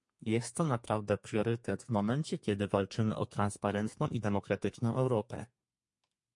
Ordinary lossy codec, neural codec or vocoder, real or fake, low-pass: MP3, 48 kbps; codec, 32 kHz, 1.9 kbps, SNAC; fake; 10.8 kHz